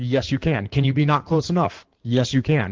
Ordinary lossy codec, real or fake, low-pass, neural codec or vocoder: Opus, 16 kbps; fake; 7.2 kHz; codec, 16 kHz in and 24 kHz out, 2.2 kbps, FireRedTTS-2 codec